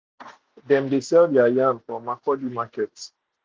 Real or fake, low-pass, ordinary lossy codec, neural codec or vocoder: real; none; none; none